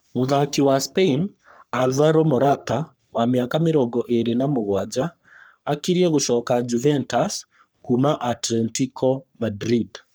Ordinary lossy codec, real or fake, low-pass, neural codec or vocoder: none; fake; none; codec, 44.1 kHz, 3.4 kbps, Pupu-Codec